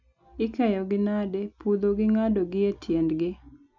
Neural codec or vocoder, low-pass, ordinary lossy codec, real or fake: none; 7.2 kHz; none; real